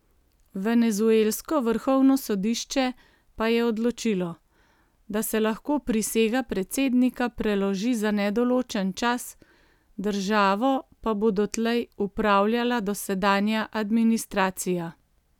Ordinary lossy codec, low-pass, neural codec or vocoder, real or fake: none; 19.8 kHz; none; real